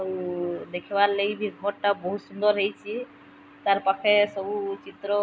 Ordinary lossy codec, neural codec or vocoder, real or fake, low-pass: none; none; real; none